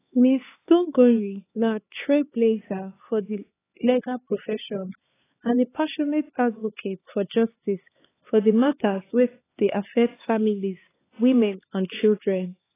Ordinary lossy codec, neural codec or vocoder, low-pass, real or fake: AAC, 16 kbps; codec, 16 kHz, 4 kbps, X-Codec, HuBERT features, trained on LibriSpeech; 3.6 kHz; fake